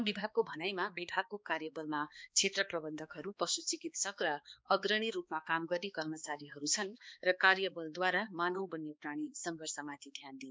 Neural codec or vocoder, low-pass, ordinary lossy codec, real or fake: codec, 16 kHz, 2 kbps, X-Codec, HuBERT features, trained on balanced general audio; none; none; fake